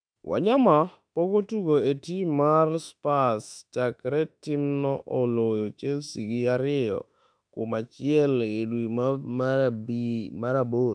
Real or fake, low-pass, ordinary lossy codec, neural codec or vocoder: fake; 9.9 kHz; none; autoencoder, 48 kHz, 32 numbers a frame, DAC-VAE, trained on Japanese speech